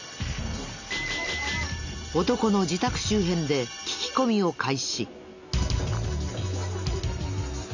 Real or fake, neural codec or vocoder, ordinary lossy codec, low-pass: real; none; none; 7.2 kHz